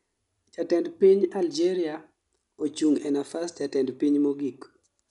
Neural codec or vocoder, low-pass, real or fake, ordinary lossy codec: none; 10.8 kHz; real; none